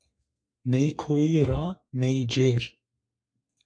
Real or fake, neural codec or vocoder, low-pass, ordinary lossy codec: fake; codec, 32 kHz, 1.9 kbps, SNAC; 9.9 kHz; MP3, 64 kbps